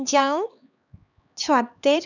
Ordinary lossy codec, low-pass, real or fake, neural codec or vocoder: none; 7.2 kHz; fake; codec, 16 kHz, 2 kbps, X-Codec, HuBERT features, trained on LibriSpeech